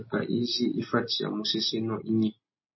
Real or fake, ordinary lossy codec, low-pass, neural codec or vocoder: real; MP3, 24 kbps; 7.2 kHz; none